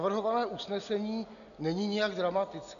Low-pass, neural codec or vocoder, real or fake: 7.2 kHz; none; real